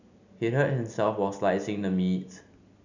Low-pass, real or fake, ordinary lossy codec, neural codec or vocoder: 7.2 kHz; real; none; none